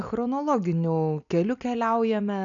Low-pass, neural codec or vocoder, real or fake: 7.2 kHz; none; real